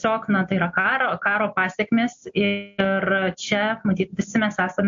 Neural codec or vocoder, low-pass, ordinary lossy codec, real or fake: none; 7.2 kHz; MP3, 48 kbps; real